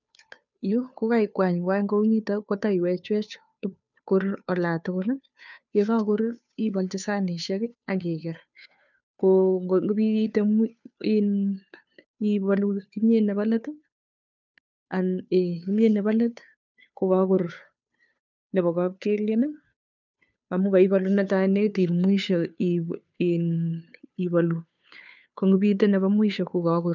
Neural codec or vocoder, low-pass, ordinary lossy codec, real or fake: codec, 16 kHz, 2 kbps, FunCodec, trained on Chinese and English, 25 frames a second; 7.2 kHz; none; fake